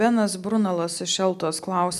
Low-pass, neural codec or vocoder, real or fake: 14.4 kHz; none; real